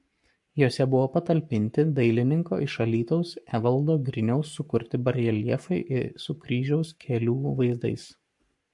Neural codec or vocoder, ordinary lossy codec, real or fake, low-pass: codec, 44.1 kHz, 7.8 kbps, Pupu-Codec; MP3, 64 kbps; fake; 10.8 kHz